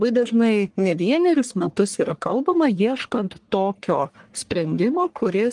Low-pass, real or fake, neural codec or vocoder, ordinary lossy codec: 10.8 kHz; fake; codec, 44.1 kHz, 1.7 kbps, Pupu-Codec; Opus, 24 kbps